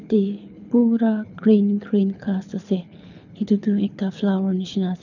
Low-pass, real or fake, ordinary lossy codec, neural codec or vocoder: 7.2 kHz; fake; none; codec, 24 kHz, 6 kbps, HILCodec